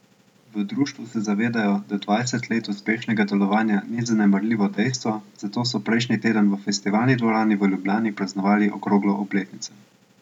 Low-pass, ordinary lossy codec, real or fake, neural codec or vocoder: 19.8 kHz; none; real; none